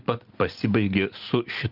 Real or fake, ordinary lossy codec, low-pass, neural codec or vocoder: real; Opus, 32 kbps; 5.4 kHz; none